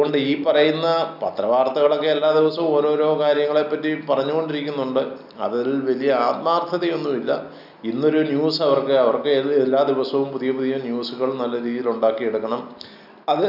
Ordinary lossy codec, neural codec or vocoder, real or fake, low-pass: none; none; real; 5.4 kHz